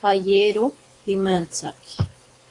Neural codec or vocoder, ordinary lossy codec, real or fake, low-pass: codec, 24 kHz, 3 kbps, HILCodec; AAC, 48 kbps; fake; 10.8 kHz